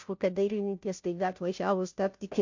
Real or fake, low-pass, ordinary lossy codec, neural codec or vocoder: fake; 7.2 kHz; MP3, 48 kbps; codec, 16 kHz, 0.5 kbps, FunCodec, trained on Chinese and English, 25 frames a second